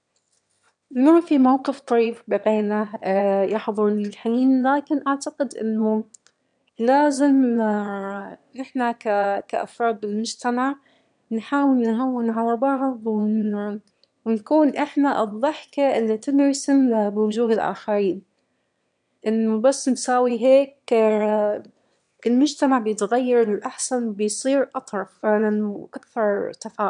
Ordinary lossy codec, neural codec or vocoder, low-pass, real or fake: none; autoencoder, 22.05 kHz, a latent of 192 numbers a frame, VITS, trained on one speaker; 9.9 kHz; fake